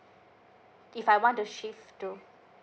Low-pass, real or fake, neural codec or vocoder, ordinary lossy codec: none; real; none; none